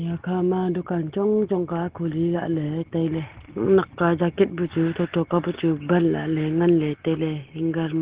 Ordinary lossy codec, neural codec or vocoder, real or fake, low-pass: Opus, 16 kbps; none; real; 3.6 kHz